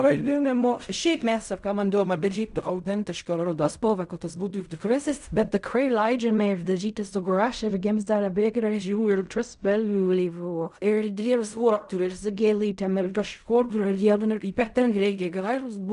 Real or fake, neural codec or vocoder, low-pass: fake; codec, 16 kHz in and 24 kHz out, 0.4 kbps, LongCat-Audio-Codec, fine tuned four codebook decoder; 10.8 kHz